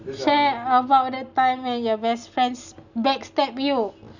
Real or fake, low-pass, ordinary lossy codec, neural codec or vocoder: real; 7.2 kHz; none; none